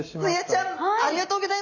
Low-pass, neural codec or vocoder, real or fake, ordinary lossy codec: 7.2 kHz; none; real; none